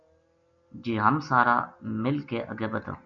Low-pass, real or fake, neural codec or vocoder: 7.2 kHz; real; none